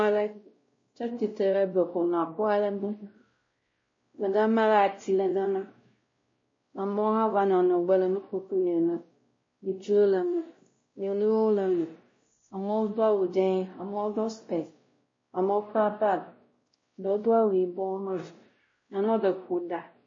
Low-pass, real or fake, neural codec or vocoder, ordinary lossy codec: 7.2 kHz; fake; codec, 16 kHz, 0.5 kbps, X-Codec, WavLM features, trained on Multilingual LibriSpeech; MP3, 32 kbps